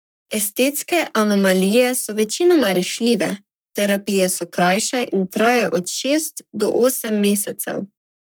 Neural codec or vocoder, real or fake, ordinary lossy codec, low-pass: codec, 44.1 kHz, 3.4 kbps, Pupu-Codec; fake; none; none